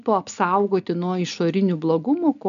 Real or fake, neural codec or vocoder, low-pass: real; none; 7.2 kHz